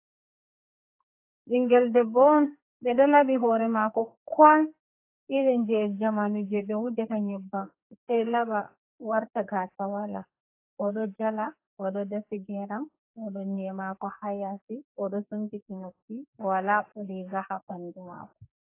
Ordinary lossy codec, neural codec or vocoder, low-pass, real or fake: AAC, 24 kbps; codec, 44.1 kHz, 2.6 kbps, SNAC; 3.6 kHz; fake